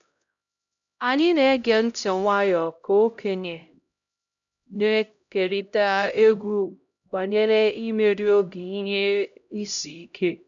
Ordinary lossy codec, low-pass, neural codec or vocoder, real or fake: none; 7.2 kHz; codec, 16 kHz, 0.5 kbps, X-Codec, HuBERT features, trained on LibriSpeech; fake